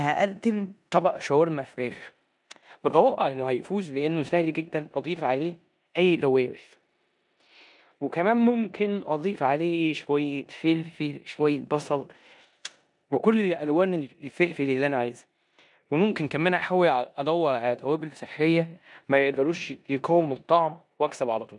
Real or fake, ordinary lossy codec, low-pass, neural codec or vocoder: fake; none; 10.8 kHz; codec, 16 kHz in and 24 kHz out, 0.9 kbps, LongCat-Audio-Codec, four codebook decoder